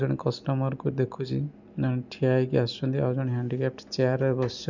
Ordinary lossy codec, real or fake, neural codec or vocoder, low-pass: none; real; none; 7.2 kHz